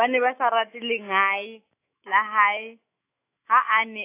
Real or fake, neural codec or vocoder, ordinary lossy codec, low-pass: fake; autoencoder, 48 kHz, 128 numbers a frame, DAC-VAE, trained on Japanese speech; AAC, 24 kbps; 3.6 kHz